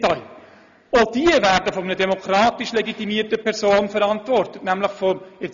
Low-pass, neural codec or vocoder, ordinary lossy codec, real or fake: 7.2 kHz; none; none; real